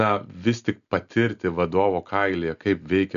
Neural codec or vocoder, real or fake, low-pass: none; real; 7.2 kHz